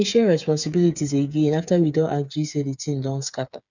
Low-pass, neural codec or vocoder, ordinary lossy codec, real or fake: 7.2 kHz; codec, 16 kHz, 8 kbps, FreqCodec, smaller model; none; fake